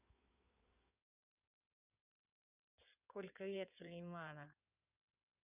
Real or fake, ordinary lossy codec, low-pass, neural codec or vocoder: fake; none; 3.6 kHz; codec, 16 kHz in and 24 kHz out, 2.2 kbps, FireRedTTS-2 codec